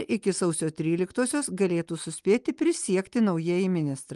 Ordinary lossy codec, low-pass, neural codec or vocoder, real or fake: Opus, 32 kbps; 10.8 kHz; none; real